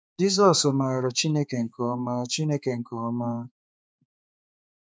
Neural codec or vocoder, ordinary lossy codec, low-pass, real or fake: codec, 16 kHz, 4 kbps, X-Codec, HuBERT features, trained on balanced general audio; none; none; fake